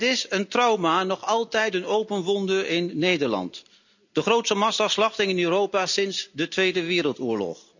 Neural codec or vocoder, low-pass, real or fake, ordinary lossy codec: none; 7.2 kHz; real; none